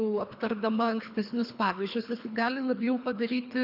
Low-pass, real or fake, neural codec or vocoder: 5.4 kHz; fake; codec, 24 kHz, 3 kbps, HILCodec